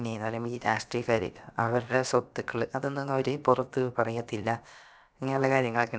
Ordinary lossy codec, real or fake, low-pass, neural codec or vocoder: none; fake; none; codec, 16 kHz, about 1 kbps, DyCAST, with the encoder's durations